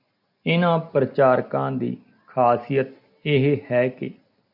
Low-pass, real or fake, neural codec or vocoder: 5.4 kHz; real; none